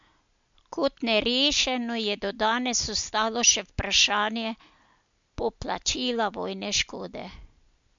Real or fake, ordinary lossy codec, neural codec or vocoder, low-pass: real; MP3, 64 kbps; none; 7.2 kHz